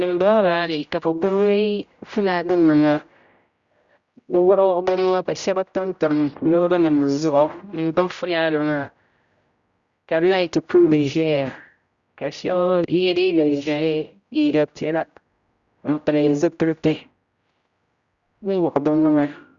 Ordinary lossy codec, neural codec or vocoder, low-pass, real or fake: Opus, 64 kbps; codec, 16 kHz, 0.5 kbps, X-Codec, HuBERT features, trained on general audio; 7.2 kHz; fake